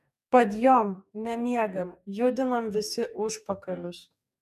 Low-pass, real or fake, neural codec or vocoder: 14.4 kHz; fake; codec, 44.1 kHz, 2.6 kbps, DAC